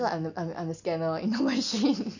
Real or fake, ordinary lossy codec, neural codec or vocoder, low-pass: real; none; none; 7.2 kHz